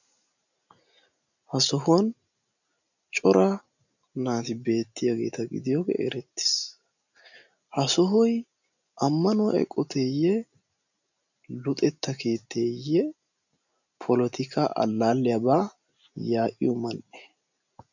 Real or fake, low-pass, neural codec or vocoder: real; 7.2 kHz; none